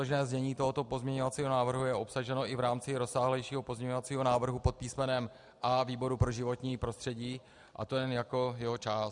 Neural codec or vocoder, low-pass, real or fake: none; 10.8 kHz; real